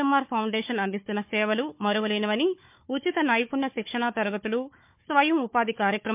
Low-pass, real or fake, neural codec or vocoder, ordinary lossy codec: 3.6 kHz; fake; codec, 16 kHz, 4 kbps, FunCodec, trained on Chinese and English, 50 frames a second; MP3, 32 kbps